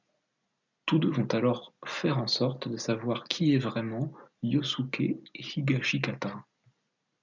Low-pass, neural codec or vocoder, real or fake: 7.2 kHz; none; real